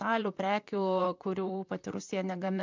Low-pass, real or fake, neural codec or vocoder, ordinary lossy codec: 7.2 kHz; fake; vocoder, 44.1 kHz, 128 mel bands, Pupu-Vocoder; MP3, 64 kbps